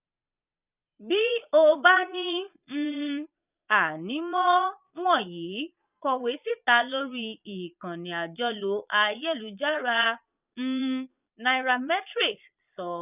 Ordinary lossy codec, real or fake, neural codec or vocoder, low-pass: none; fake; vocoder, 22.05 kHz, 80 mel bands, Vocos; 3.6 kHz